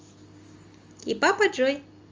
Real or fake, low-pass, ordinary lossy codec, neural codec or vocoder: real; 7.2 kHz; Opus, 32 kbps; none